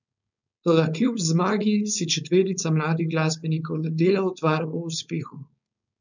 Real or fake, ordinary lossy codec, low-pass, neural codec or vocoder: fake; none; 7.2 kHz; codec, 16 kHz, 4.8 kbps, FACodec